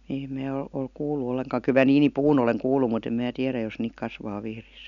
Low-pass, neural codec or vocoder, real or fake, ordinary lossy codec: 7.2 kHz; none; real; none